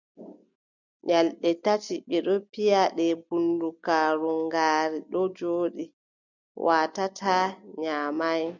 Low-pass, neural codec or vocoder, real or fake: 7.2 kHz; none; real